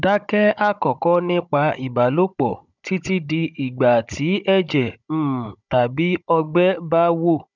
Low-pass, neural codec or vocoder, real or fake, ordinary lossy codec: 7.2 kHz; codec, 16 kHz, 16 kbps, FunCodec, trained on Chinese and English, 50 frames a second; fake; none